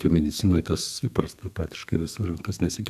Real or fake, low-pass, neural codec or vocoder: fake; 14.4 kHz; codec, 32 kHz, 1.9 kbps, SNAC